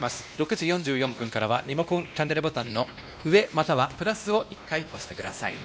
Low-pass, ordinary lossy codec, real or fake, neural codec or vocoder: none; none; fake; codec, 16 kHz, 1 kbps, X-Codec, WavLM features, trained on Multilingual LibriSpeech